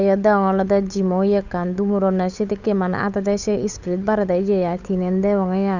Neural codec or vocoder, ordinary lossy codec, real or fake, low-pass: codec, 16 kHz, 8 kbps, FunCodec, trained on Chinese and English, 25 frames a second; none; fake; 7.2 kHz